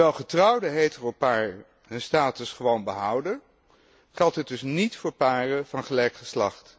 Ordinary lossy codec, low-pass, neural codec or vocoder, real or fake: none; none; none; real